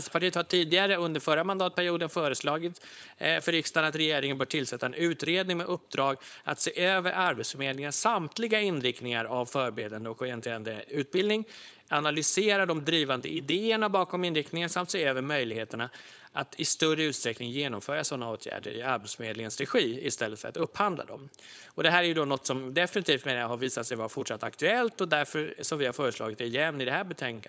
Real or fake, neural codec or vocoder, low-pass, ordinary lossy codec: fake; codec, 16 kHz, 4.8 kbps, FACodec; none; none